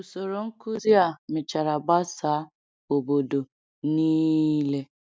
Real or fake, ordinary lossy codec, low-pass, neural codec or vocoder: real; none; none; none